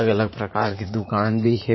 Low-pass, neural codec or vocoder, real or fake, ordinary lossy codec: 7.2 kHz; vocoder, 44.1 kHz, 80 mel bands, Vocos; fake; MP3, 24 kbps